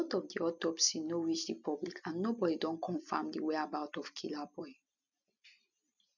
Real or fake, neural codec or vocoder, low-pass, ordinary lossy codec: real; none; 7.2 kHz; none